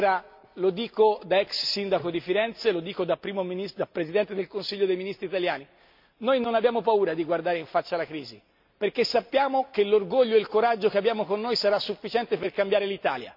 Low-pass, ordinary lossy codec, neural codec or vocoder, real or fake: 5.4 kHz; MP3, 48 kbps; none; real